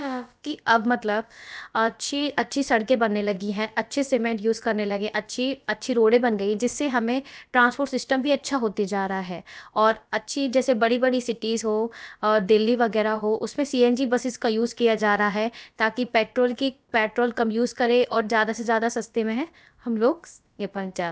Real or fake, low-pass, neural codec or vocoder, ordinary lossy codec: fake; none; codec, 16 kHz, about 1 kbps, DyCAST, with the encoder's durations; none